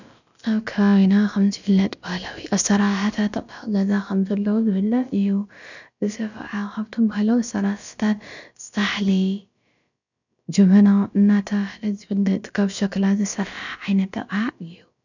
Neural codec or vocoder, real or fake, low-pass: codec, 16 kHz, about 1 kbps, DyCAST, with the encoder's durations; fake; 7.2 kHz